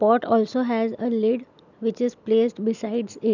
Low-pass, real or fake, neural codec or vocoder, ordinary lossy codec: 7.2 kHz; real; none; none